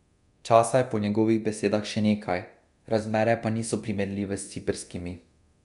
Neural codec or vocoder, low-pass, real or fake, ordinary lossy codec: codec, 24 kHz, 0.9 kbps, DualCodec; 10.8 kHz; fake; none